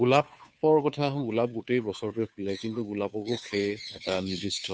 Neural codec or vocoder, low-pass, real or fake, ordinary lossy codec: codec, 16 kHz, 2 kbps, FunCodec, trained on Chinese and English, 25 frames a second; none; fake; none